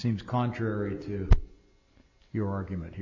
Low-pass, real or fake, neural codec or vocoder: 7.2 kHz; real; none